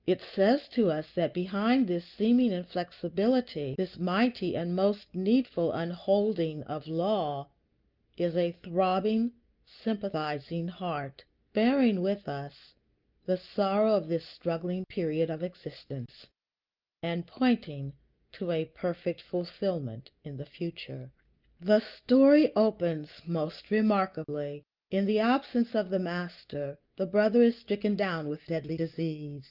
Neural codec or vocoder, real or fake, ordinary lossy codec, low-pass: none; real; Opus, 32 kbps; 5.4 kHz